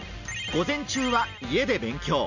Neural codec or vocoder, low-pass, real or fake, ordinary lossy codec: none; 7.2 kHz; real; none